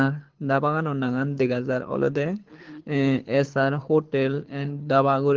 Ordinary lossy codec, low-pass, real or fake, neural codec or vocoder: Opus, 16 kbps; 7.2 kHz; fake; codec, 24 kHz, 6 kbps, HILCodec